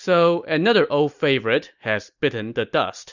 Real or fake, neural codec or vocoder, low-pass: real; none; 7.2 kHz